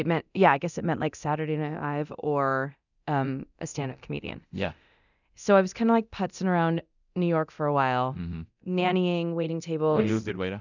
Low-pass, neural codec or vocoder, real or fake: 7.2 kHz; codec, 24 kHz, 0.9 kbps, DualCodec; fake